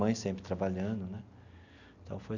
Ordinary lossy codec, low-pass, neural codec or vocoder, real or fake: none; 7.2 kHz; none; real